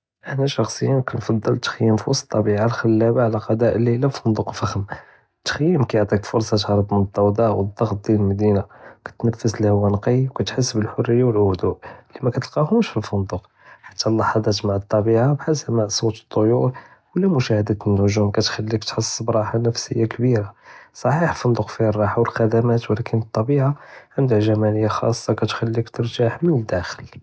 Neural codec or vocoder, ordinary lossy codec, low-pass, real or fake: none; none; none; real